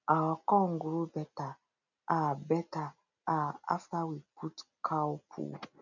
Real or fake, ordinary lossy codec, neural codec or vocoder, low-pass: real; none; none; 7.2 kHz